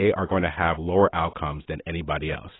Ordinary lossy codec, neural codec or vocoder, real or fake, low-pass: AAC, 16 kbps; none; real; 7.2 kHz